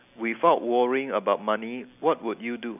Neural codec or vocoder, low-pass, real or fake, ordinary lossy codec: none; 3.6 kHz; real; none